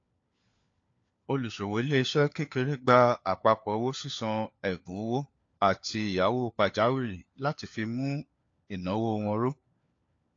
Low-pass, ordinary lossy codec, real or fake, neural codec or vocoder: 7.2 kHz; AAC, 48 kbps; fake; codec, 16 kHz, 4 kbps, FunCodec, trained on LibriTTS, 50 frames a second